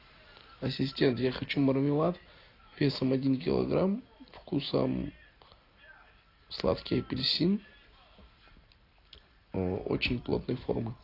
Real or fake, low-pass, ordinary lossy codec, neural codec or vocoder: real; 5.4 kHz; AAC, 32 kbps; none